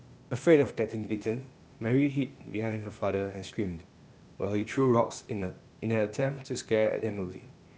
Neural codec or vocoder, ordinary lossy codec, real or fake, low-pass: codec, 16 kHz, 0.8 kbps, ZipCodec; none; fake; none